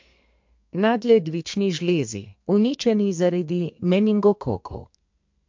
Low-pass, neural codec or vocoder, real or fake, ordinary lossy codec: 7.2 kHz; codec, 32 kHz, 1.9 kbps, SNAC; fake; MP3, 48 kbps